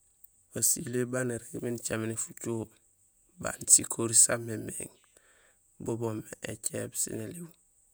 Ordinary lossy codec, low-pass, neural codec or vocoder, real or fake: none; none; vocoder, 48 kHz, 128 mel bands, Vocos; fake